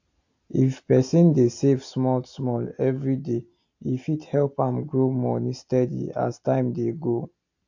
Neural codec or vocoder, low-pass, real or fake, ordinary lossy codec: none; 7.2 kHz; real; AAC, 48 kbps